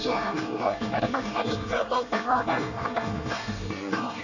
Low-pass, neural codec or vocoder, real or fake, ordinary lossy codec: 7.2 kHz; codec, 24 kHz, 1 kbps, SNAC; fake; none